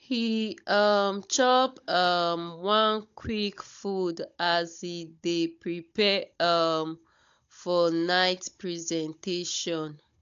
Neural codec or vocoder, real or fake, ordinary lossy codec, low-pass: codec, 16 kHz, 16 kbps, FunCodec, trained on Chinese and English, 50 frames a second; fake; AAC, 64 kbps; 7.2 kHz